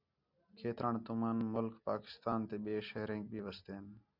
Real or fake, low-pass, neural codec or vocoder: real; 5.4 kHz; none